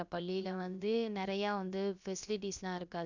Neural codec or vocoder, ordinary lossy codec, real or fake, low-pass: codec, 16 kHz, about 1 kbps, DyCAST, with the encoder's durations; none; fake; 7.2 kHz